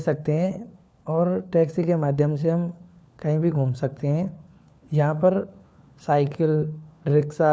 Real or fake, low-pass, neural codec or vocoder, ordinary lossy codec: fake; none; codec, 16 kHz, 4 kbps, FunCodec, trained on LibriTTS, 50 frames a second; none